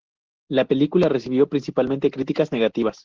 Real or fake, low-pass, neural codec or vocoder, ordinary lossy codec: real; 7.2 kHz; none; Opus, 16 kbps